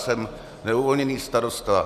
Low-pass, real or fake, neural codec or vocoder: 14.4 kHz; real; none